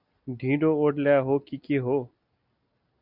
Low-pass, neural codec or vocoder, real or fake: 5.4 kHz; none; real